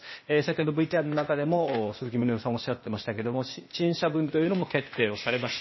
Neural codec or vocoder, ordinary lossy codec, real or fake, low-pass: codec, 16 kHz, 0.8 kbps, ZipCodec; MP3, 24 kbps; fake; 7.2 kHz